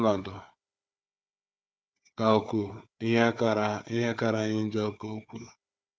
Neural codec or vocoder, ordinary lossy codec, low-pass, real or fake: codec, 16 kHz, 4 kbps, FunCodec, trained on Chinese and English, 50 frames a second; none; none; fake